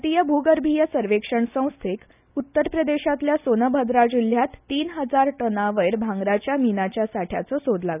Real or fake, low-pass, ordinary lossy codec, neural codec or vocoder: real; 3.6 kHz; none; none